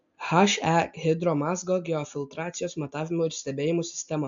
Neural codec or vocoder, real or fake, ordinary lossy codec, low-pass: none; real; MP3, 64 kbps; 7.2 kHz